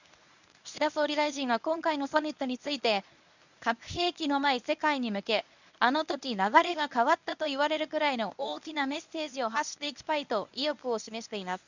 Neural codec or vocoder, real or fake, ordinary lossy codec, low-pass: codec, 24 kHz, 0.9 kbps, WavTokenizer, medium speech release version 1; fake; none; 7.2 kHz